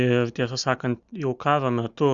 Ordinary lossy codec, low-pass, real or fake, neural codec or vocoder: Opus, 64 kbps; 7.2 kHz; real; none